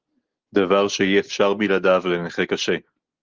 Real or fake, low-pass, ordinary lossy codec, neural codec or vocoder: real; 7.2 kHz; Opus, 16 kbps; none